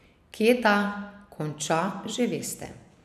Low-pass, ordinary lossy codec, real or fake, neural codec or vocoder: 14.4 kHz; none; real; none